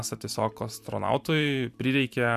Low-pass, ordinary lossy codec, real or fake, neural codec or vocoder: 14.4 kHz; MP3, 96 kbps; fake; vocoder, 44.1 kHz, 128 mel bands every 512 samples, BigVGAN v2